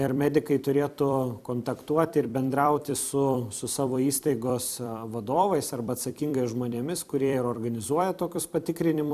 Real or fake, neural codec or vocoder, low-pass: fake; vocoder, 44.1 kHz, 128 mel bands every 256 samples, BigVGAN v2; 14.4 kHz